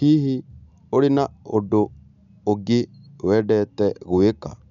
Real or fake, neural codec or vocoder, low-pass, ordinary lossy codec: real; none; 7.2 kHz; none